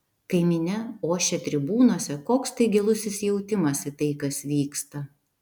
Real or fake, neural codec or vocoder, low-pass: real; none; 19.8 kHz